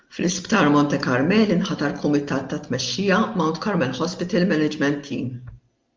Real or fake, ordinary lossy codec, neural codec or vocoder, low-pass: real; Opus, 32 kbps; none; 7.2 kHz